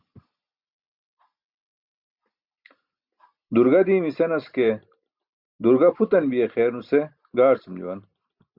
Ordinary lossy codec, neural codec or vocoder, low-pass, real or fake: Opus, 64 kbps; none; 5.4 kHz; real